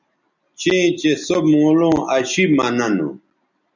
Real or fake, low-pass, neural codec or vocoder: real; 7.2 kHz; none